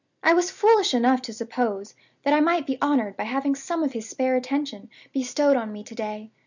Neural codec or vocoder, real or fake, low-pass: none; real; 7.2 kHz